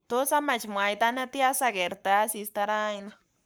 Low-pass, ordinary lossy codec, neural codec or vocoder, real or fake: none; none; codec, 44.1 kHz, 7.8 kbps, Pupu-Codec; fake